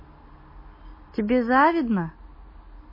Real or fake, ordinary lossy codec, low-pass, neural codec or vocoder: real; MP3, 24 kbps; 5.4 kHz; none